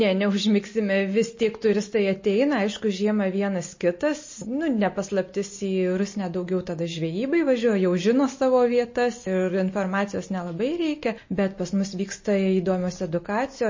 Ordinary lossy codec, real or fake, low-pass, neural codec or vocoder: MP3, 32 kbps; real; 7.2 kHz; none